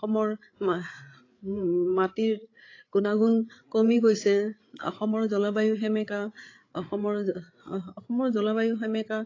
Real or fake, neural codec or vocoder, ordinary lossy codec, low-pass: fake; codec, 16 kHz, 8 kbps, FreqCodec, larger model; AAC, 32 kbps; 7.2 kHz